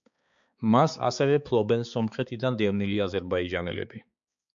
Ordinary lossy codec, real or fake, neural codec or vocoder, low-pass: MP3, 64 kbps; fake; codec, 16 kHz, 4 kbps, X-Codec, HuBERT features, trained on balanced general audio; 7.2 kHz